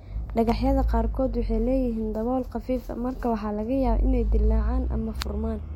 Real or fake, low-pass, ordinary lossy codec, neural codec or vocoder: real; 19.8 kHz; MP3, 64 kbps; none